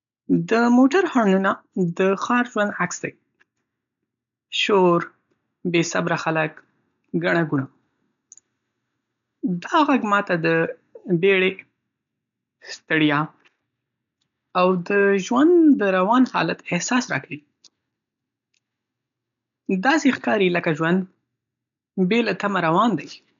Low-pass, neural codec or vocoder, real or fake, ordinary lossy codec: 7.2 kHz; none; real; none